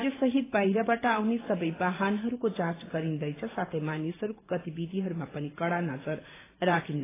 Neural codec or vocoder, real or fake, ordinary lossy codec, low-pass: vocoder, 44.1 kHz, 128 mel bands every 512 samples, BigVGAN v2; fake; AAC, 16 kbps; 3.6 kHz